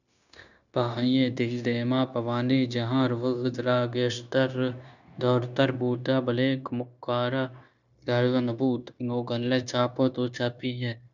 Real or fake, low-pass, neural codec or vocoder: fake; 7.2 kHz; codec, 16 kHz, 0.9 kbps, LongCat-Audio-Codec